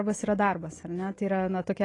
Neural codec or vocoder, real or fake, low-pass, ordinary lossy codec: none; real; 10.8 kHz; AAC, 32 kbps